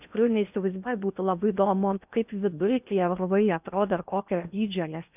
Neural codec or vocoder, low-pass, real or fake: codec, 16 kHz in and 24 kHz out, 0.8 kbps, FocalCodec, streaming, 65536 codes; 3.6 kHz; fake